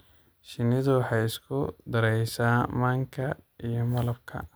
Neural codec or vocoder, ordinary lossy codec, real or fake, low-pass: none; none; real; none